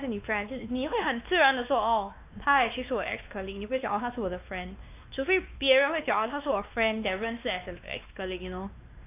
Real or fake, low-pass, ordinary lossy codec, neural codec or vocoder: fake; 3.6 kHz; none; codec, 16 kHz, 2 kbps, X-Codec, WavLM features, trained on Multilingual LibriSpeech